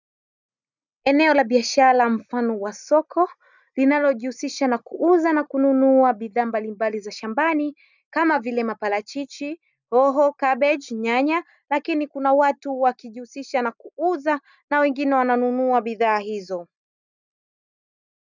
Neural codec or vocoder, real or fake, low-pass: none; real; 7.2 kHz